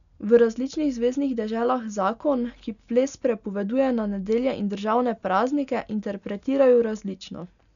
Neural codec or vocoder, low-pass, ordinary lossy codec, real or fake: none; 7.2 kHz; none; real